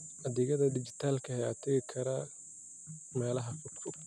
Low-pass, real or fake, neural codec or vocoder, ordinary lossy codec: none; real; none; none